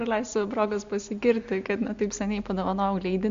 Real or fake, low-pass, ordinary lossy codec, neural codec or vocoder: real; 7.2 kHz; MP3, 64 kbps; none